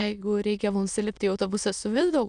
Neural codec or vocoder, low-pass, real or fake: autoencoder, 22.05 kHz, a latent of 192 numbers a frame, VITS, trained on many speakers; 9.9 kHz; fake